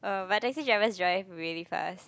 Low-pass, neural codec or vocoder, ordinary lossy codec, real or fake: none; none; none; real